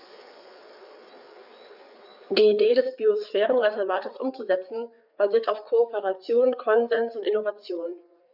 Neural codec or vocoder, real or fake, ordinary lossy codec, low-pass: codec, 16 kHz, 4 kbps, FreqCodec, larger model; fake; none; 5.4 kHz